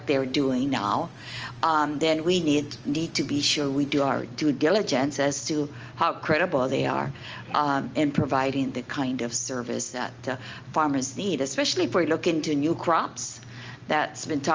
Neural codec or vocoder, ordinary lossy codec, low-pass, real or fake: none; Opus, 24 kbps; 7.2 kHz; real